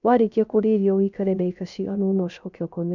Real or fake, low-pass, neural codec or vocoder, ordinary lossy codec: fake; 7.2 kHz; codec, 16 kHz, 0.3 kbps, FocalCodec; none